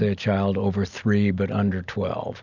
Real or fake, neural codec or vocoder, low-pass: real; none; 7.2 kHz